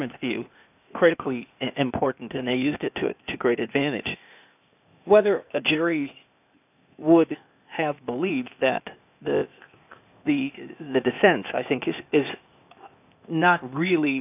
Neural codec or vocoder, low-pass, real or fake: codec, 16 kHz, 0.8 kbps, ZipCodec; 3.6 kHz; fake